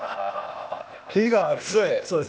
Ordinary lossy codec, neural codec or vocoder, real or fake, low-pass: none; codec, 16 kHz, 0.8 kbps, ZipCodec; fake; none